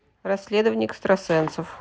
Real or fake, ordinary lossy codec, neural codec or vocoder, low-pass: real; none; none; none